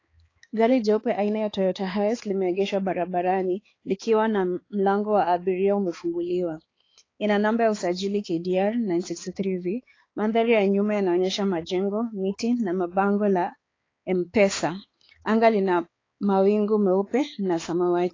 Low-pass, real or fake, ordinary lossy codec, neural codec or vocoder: 7.2 kHz; fake; AAC, 32 kbps; codec, 16 kHz, 4 kbps, X-Codec, HuBERT features, trained on balanced general audio